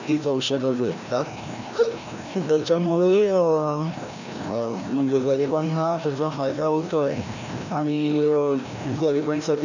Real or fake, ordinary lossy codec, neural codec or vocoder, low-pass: fake; none; codec, 16 kHz, 1 kbps, FreqCodec, larger model; 7.2 kHz